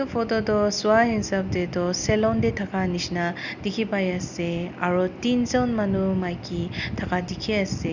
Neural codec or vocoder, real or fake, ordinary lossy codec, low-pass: none; real; none; 7.2 kHz